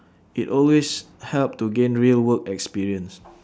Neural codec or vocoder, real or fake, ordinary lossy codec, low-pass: none; real; none; none